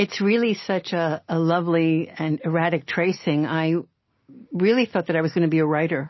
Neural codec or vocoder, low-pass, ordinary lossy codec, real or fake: none; 7.2 kHz; MP3, 24 kbps; real